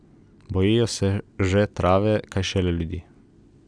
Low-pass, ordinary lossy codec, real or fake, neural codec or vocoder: 9.9 kHz; none; real; none